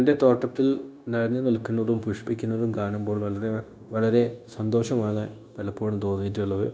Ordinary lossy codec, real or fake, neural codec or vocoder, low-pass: none; fake; codec, 16 kHz, 0.9 kbps, LongCat-Audio-Codec; none